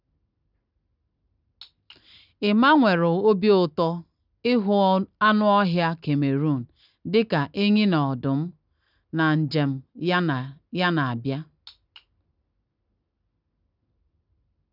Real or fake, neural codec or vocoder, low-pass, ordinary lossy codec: real; none; 5.4 kHz; none